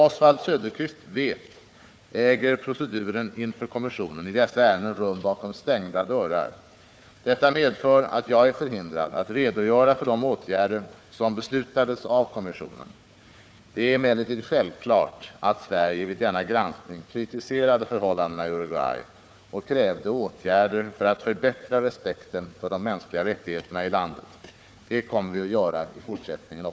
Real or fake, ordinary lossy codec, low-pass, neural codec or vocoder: fake; none; none; codec, 16 kHz, 4 kbps, FunCodec, trained on Chinese and English, 50 frames a second